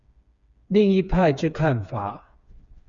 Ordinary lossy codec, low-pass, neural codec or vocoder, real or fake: Opus, 64 kbps; 7.2 kHz; codec, 16 kHz, 4 kbps, FreqCodec, smaller model; fake